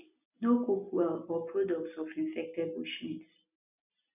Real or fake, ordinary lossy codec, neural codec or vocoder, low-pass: real; none; none; 3.6 kHz